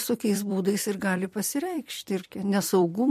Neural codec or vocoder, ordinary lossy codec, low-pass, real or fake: vocoder, 44.1 kHz, 128 mel bands every 256 samples, BigVGAN v2; MP3, 64 kbps; 14.4 kHz; fake